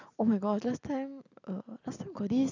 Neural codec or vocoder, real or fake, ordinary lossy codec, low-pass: none; real; none; 7.2 kHz